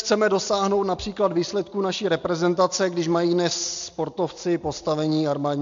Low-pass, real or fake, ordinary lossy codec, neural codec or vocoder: 7.2 kHz; real; MP3, 64 kbps; none